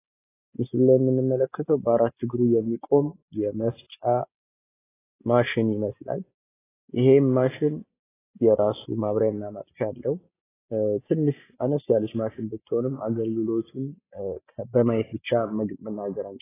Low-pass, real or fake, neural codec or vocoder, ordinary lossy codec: 3.6 kHz; real; none; AAC, 16 kbps